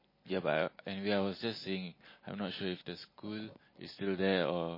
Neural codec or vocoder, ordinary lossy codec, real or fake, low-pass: none; MP3, 24 kbps; real; 5.4 kHz